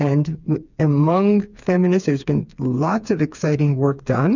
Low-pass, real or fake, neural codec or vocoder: 7.2 kHz; fake; codec, 16 kHz, 4 kbps, FreqCodec, smaller model